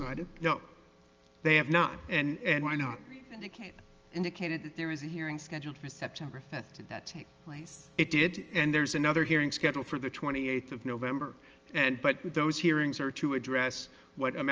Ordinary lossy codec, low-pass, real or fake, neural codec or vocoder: Opus, 24 kbps; 7.2 kHz; real; none